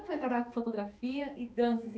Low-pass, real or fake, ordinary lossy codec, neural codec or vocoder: none; fake; none; codec, 16 kHz, 2 kbps, X-Codec, HuBERT features, trained on balanced general audio